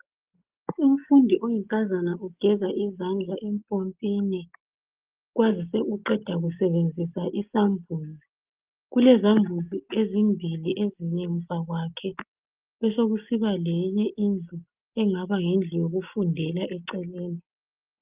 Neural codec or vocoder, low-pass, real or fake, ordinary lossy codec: none; 3.6 kHz; real; Opus, 24 kbps